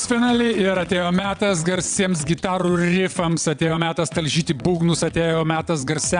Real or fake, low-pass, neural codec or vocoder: fake; 9.9 kHz; vocoder, 22.05 kHz, 80 mel bands, WaveNeXt